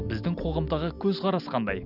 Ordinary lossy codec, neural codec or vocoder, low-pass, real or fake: none; none; 5.4 kHz; real